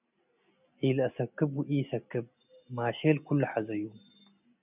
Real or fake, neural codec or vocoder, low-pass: real; none; 3.6 kHz